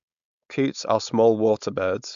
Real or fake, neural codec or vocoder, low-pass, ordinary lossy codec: fake; codec, 16 kHz, 4.8 kbps, FACodec; 7.2 kHz; AAC, 96 kbps